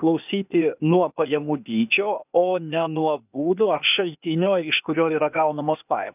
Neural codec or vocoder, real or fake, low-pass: codec, 16 kHz, 0.8 kbps, ZipCodec; fake; 3.6 kHz